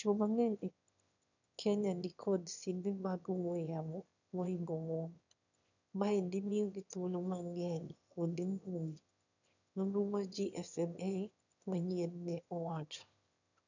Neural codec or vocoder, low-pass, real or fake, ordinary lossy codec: autoencoder, 22.05 kHz, a latent of 192 numbers a frame, VITS, trained on one speaker; 7.2 kHz; fake; none